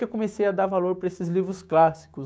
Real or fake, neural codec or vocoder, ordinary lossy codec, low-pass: fake; codec, 16 kHz, 6 kbps, DAC; none; none